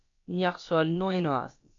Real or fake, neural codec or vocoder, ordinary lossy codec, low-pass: fake; codec, 16 kHz, about 1 kbps, DyCAST, with the encoder's durations; AAC, 48 kbps; 7.2 kHz